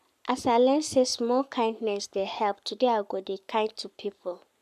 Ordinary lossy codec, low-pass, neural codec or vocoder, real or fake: none; 14.4 kHz; codec, 44.1 kHz, 7.8 kbps, Pupu-Codec; fake